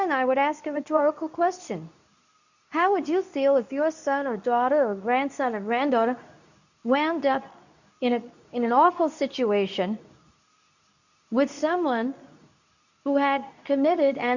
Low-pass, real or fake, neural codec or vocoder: 7.2 kHz; fake; codec, 24 kHz, 0.9 kbps, WavTokenizer, medium speech release version 2